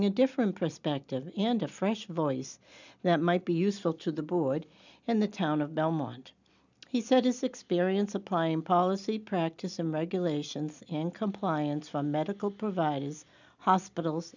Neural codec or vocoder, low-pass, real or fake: none; 7.2 kHz; real